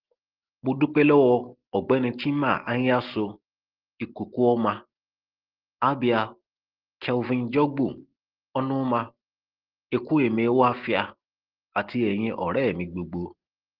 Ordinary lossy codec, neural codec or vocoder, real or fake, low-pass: Opus, 16 kbps; none; real; 5.4 kHz